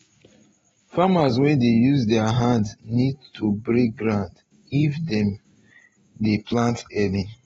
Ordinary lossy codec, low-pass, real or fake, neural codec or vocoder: AAC, 24 kbps; 19.8 kHz; real; none